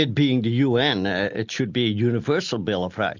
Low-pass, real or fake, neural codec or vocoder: 7.2 kHz; real; none